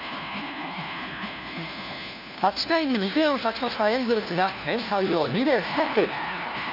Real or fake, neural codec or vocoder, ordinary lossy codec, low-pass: fake; codec, 16 kHz, 1 kbps, FunCodec, trained on LibriTTS, 50 frames a second; none; 5.4 kHz